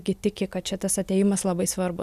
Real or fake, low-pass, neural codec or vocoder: real; 14.4 kHz; none